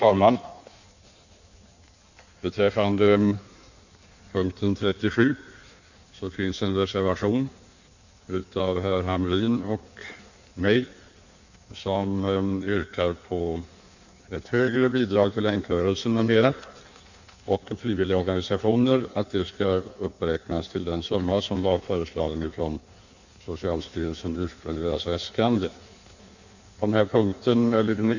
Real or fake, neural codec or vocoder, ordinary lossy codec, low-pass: fake; codec, 16 kHz in and 24 kHz out, 1.1 kbps, FireRedTTS-2 codec; none; 7.2 kHz